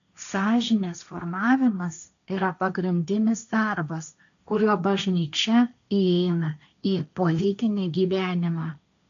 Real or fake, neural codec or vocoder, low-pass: fake; codec, 16 kHz, 1.1 kbps, Voila-Tokenizer; 7.2 kHz